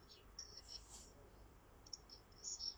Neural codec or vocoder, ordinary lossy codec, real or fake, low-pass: vocoder, 44.1 kHz, 128 mel bands, Pupu-Vocoder; none; fake; none